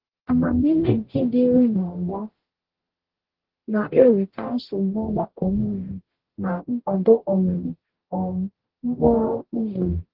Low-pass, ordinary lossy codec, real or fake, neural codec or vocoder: 5.4 kHz; Opus, 16 kbps; fake; codec, 44.1 kHz, 0.9 kbps, DAC